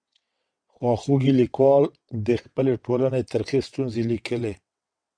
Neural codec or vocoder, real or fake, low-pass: vocoder, 22.05 kHz, 80 mel bands, WaveNeXt; fake; 9.9 kHz